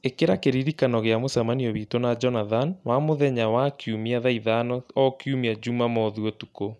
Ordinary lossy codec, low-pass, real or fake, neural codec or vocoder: none; none; real; none